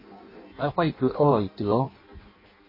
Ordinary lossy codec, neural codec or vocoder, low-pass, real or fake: MP3, 24 kbps; codec, 16 kHz in and 24 kHz out, 0.6 kbps, FireRedTTS-2 codec; 5.4 kHz; fake